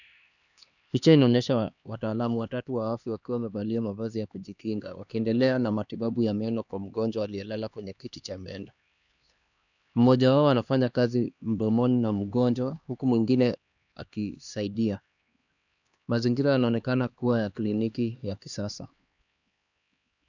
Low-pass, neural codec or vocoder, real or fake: 7.2 kHz; codec, 16 kHz, 2 kbps, X-Codec, HuBERT features, trained on LibriSpeech; fake